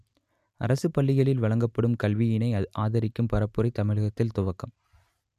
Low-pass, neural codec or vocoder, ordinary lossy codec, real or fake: 14.4 kHz; none; none; real